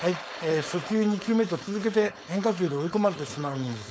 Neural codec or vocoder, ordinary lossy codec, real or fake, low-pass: codec, 16 kHz, 4.8 kbps, FACodec; none; fake; none